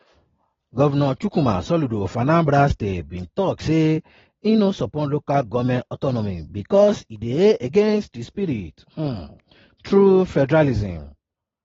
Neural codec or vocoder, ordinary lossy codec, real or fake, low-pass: none; AAC, 24 kbps; real; 7.2 kHz